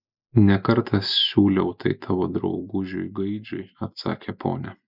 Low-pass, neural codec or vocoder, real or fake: 5.4 kHz; none; real